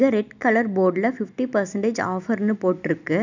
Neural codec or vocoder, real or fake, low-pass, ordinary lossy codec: none; real; 7.2 kHz; none